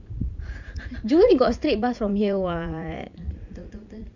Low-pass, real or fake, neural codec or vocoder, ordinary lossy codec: 7.2 kHz; fake; vocoder, 44.1 kHz, 80 mel bands, Vocos; none